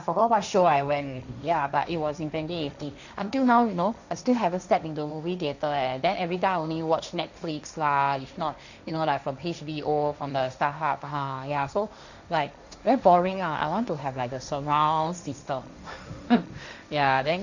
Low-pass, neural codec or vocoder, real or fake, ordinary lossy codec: 7.2 kHz; codec, 16 kHz, 1.1 kbps, Voila-Tokenizer; fake; none